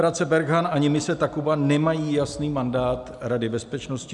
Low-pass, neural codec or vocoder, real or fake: 10.8 kHz; none; real